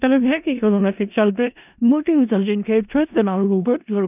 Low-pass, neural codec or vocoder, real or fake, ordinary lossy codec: 3.6 kHz; codec, 16 kHz in and 24 kHz out, 0.4 kbps, LongCat-Audio-Codec, four codebook decoder; fake; none